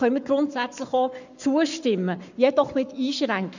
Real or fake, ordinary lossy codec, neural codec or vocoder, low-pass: fake; none; codec, 44.1 kHz, 7.8 kbps, Pupu-Codec; 7.2 kHz